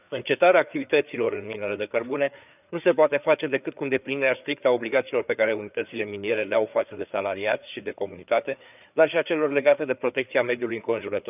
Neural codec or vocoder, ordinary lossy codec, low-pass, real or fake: codec, 16 kHz, 4 kbps, FreqCodec, larger model; none; 3.6 kHz; fake